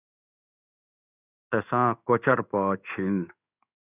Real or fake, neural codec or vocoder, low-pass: fake; codec, 16 kHz in and 24 kHz out, 1 kbps, XY-Tokenizer; 3.6 kHz